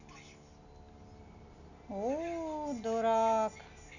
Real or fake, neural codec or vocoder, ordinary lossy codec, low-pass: real; none; none; 7.2 kHz